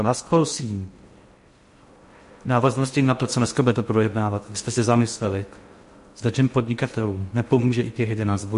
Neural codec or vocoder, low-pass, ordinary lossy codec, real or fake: codec, 16 kHz in and 24 kHz out, 0.6 kbps, FocalCodec, streaming, 2048 codes; 10.8 kHz; MP3, 48 kbps; fake